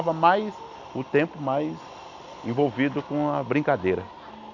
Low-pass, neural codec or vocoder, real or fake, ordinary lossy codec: 7.2 kHz; none; real; none